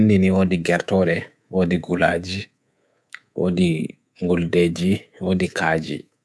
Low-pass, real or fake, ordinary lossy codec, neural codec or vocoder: none; fake; none; codec, 24 kHz, 3.1 kbps, DualCodec